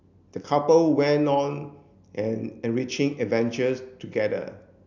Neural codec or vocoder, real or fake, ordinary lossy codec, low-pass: none; real; none; 7.2 kHz